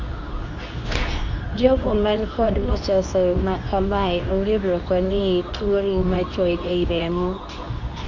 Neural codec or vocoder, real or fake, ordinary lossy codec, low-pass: codec, 24 kHz, 0.9 kbps, WavTokenizer, medium speech release version 2; fake; Opus, 64 kbps; 7.2 kHz